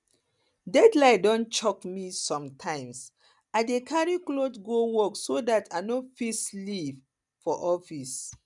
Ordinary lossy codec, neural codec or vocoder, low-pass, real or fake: none; none; 10.8 kHz; real